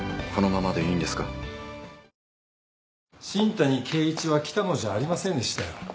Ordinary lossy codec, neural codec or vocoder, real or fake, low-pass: none; none; real; none